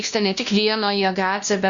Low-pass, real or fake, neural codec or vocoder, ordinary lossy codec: 7.2 kHz; fake; codec, 16 kHz, 1 kbps, X-Codec, WavLM features, trained on Multilingual LibriSpeech; Opus, 64 kbps